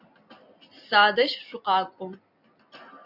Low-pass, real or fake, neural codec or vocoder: 5.4 kHz; real; none